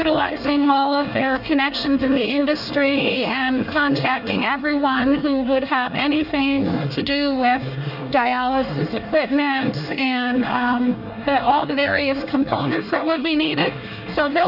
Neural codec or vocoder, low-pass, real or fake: codec, 24 kHz, 1 kbps, SNAC; 5.4 kHz; fake